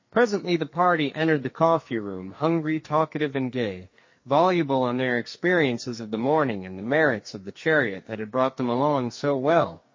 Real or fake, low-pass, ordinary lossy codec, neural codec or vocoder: fake; 7.2 kHz; MP3, 32 kbps; codec, 44.1 kHz, 2.6 kbps, SNAC